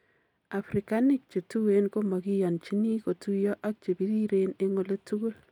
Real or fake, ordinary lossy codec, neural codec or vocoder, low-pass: real; none; none; 19.8 kHz